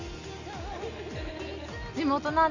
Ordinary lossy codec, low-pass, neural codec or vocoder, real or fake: none; 7.2 kHz; none; real